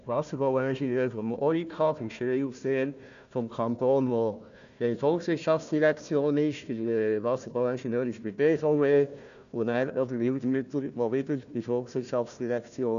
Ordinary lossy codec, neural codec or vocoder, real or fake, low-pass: none; codec, 16 kHz, 1 kbps, FunCodec, trained on Chinese and English, 50 frames a second; fake; 7.2 kHz